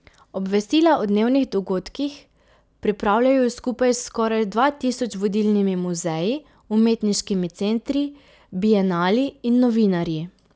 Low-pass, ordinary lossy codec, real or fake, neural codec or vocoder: none; none; real; none